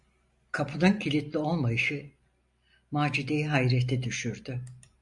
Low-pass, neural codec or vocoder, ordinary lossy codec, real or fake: 10.8 kHz; none; MP3, 64 kbps; real